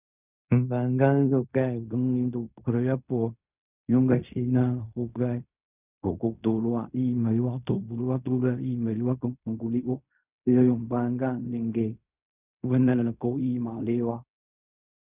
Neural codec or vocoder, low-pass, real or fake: codec, 16 kHz in and 24 kHz out, 0.4 kbps, LongCat-Audio-Codec, fine tuned four codebook decoder; 3.6 kHz; fake